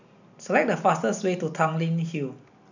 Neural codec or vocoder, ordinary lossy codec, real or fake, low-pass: none; none; real; 7.2 kHz